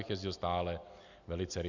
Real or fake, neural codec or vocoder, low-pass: real; none; 7.2 kHz